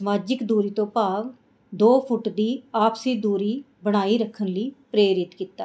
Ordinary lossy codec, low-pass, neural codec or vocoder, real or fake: none; none; none; real